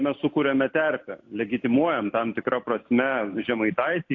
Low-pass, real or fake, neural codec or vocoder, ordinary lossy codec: 7.2 kHz; real; none; AAC, 48 kbps